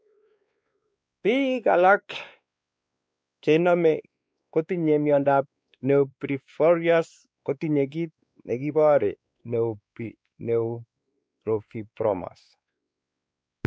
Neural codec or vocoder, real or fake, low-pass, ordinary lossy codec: codec, 16 kHz, 2 kbps, X-Codec, WavLM features, trained on Multilingual LibriSpeech; fake; none; none